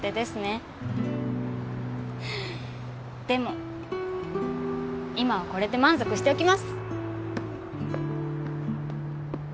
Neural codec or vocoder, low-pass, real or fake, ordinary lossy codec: none; none; real; none